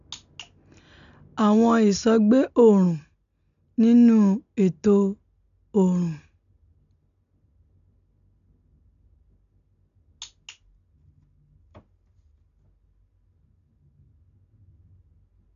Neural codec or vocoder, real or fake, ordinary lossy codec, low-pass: none; real; none; 7.2 kHz